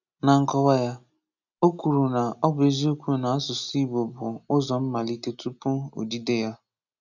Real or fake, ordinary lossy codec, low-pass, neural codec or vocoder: real; none; 7.2 kHz; none